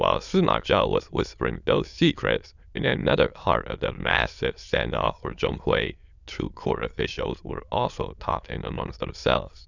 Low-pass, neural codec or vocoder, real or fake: 7.2 kHz; autoencoder, 22.05 kHz, a latent of 192 numbers a frame, VITS, trained on many speakers; fake